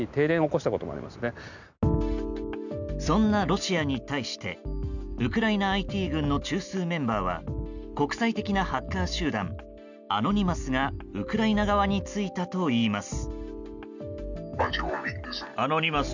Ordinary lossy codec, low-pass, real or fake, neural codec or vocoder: none; 7.2 kHz; real; none